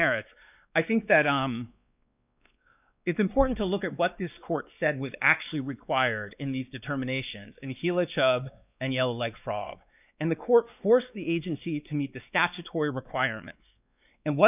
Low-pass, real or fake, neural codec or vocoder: 3.6 kHz; fake; codec, 16 kHz, 2 kbps, X-Codec, WavLM features, trained on Multilingual LibriSpeech